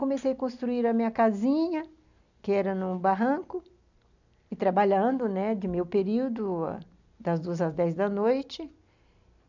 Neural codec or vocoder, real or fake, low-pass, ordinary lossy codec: none; real; 7.2 kHz; none